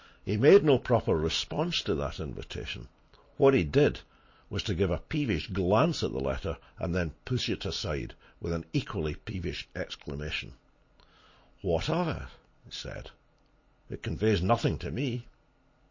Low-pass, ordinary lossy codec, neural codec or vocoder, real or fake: 7.2 kHz; MP3, 32 kbps; none; real